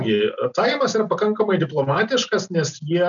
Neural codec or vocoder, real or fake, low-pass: none; real; 7.2 kHz